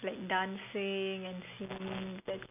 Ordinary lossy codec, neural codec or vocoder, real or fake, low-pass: none; none; real; 3.6 kHz